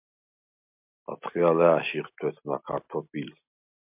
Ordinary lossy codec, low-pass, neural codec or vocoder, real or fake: MP3, 32 kbps; 3.6 kHz; none; real